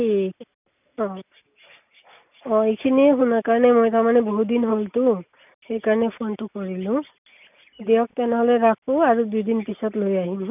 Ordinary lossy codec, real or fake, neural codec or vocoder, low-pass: none; real; none; 3.6 kHz